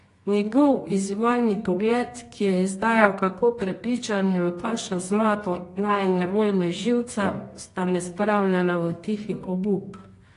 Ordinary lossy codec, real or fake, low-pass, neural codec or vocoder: AAC, 48 kbps; fake; 10.8 kHz; codec, 24 kHz, 0.9 kbps, WavTokenizer, medium music audio release